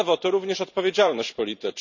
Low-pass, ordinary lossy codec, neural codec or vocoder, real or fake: 7.2 kHz; none; vocoder, 44.1 kHz, 128 mel bands every 512 samples, BigVGAN v2; fake